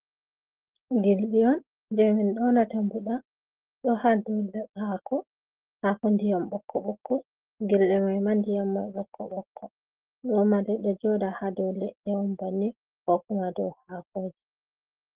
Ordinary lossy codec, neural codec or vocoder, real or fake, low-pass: Opus, 16 kbps; none; real; 3.6 kHz